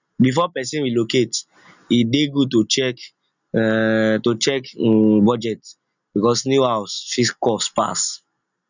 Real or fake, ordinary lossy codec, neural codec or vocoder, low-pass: real; none; none; 7.2 kHz